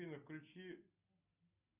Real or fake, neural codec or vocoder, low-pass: real; none; 3.6 kHz